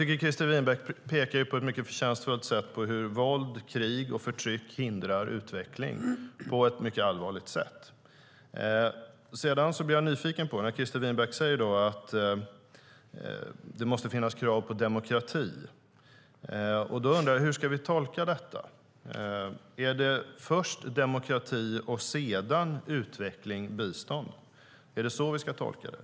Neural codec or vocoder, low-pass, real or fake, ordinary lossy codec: none; none; real; none